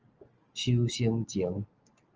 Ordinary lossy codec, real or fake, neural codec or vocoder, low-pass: Opus, 24 kbps; real; none; 7.2 kHz